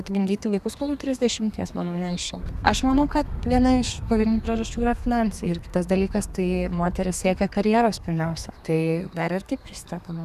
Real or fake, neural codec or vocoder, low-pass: fake; codec, 32 kHz, 1.9 kbps, SNAC; 14.4 kHz